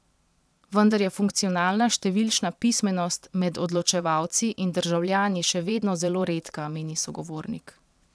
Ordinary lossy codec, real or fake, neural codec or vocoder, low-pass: none; fake; vocoder, 22.05 kHz, 80 mel bands, Vocos; none